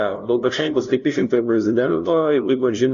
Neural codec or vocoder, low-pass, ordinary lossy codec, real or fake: codec, 16 kHz, 0.5 kbps, FunCodec, trained on LibriTTS, 25 frames a second; 7.2 kHz; Opus, 64 kbps; fake